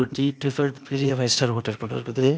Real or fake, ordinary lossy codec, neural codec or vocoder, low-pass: fake; none; codec, 16 kHz, 0.8 kbps, ZipCodec; none